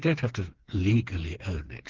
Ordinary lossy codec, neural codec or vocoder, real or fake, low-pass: Opus, 16 kbps; codec, 16 kHz, 4 kbps, FreqCodec, smaller model; fake; 7.2 kHz